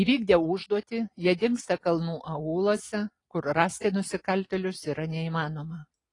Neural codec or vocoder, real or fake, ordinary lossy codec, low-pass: none; real; AAC, 32 kbps; 10.8 kHz